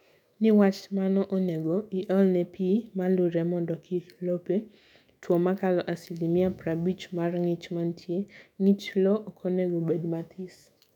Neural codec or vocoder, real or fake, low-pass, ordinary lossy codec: autoencoder, 48 kHz, 128 numbers a frame, DAC-VAE, trained on Japanese speech; fake; 19.8 kHz; none